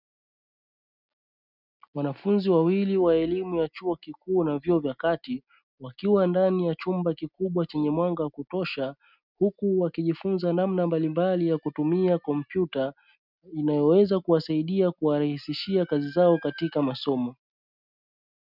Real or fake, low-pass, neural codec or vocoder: real; 5.4 kHz; none